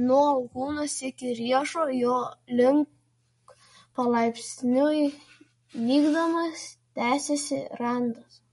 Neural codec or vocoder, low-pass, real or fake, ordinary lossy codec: none; 19.8 kHz; real; MP3, 48 kbps